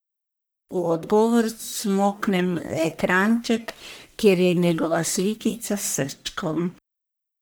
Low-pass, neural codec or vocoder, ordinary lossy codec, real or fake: none; codec, 44.1 kHz, 1.7 kbps, Pupu-Codec; none; fake